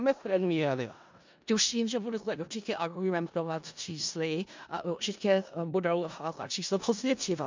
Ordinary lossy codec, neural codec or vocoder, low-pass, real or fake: MP3, 64 kbps; codec, 16 kHz in and 24 kHz out, 0.4 kbps, LongCat-Audio-Codec, four codebook decoder; 7.2 kHz; fake